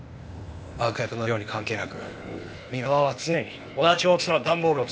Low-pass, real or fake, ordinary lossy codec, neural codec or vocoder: none; fake; none; codec, 16 kHz, 0.8 kbps, ZipCodec